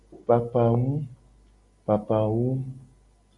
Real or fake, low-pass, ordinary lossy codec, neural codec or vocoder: real; 10.8 kHz; AAC, 48 kbps; none